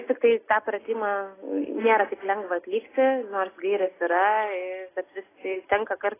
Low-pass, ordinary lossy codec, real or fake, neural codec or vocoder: 3.6 kHz; AAC, 16 kbps; real; none